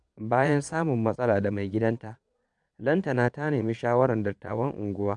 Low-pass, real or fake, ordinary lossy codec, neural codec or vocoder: 9.9 kHz; fake; none; vocoder, 22.05 kHz, 80 mel bands, Vocos